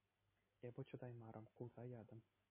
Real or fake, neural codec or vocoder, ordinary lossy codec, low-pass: real; none; MP3, 16 kbps; 3.6 kHz